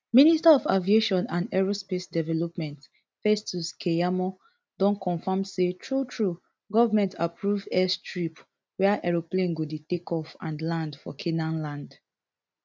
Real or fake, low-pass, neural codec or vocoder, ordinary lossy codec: real; none; none; none